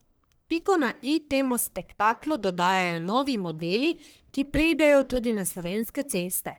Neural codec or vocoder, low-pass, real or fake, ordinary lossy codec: codec, 44.1 kHz, 1.7 kbps, Pupu-Codec; none; fake; none